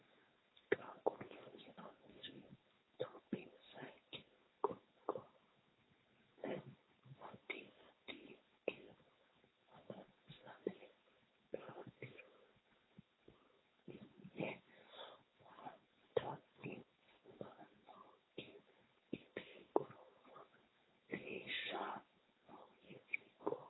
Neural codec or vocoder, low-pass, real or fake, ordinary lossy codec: codec, 16 kHz, 4.8 kbps, FACodec; 7.2 kHz; fake; AAC, 16 kbps